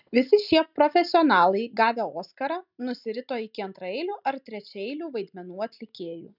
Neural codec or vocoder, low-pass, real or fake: none; 5.4 kHz; real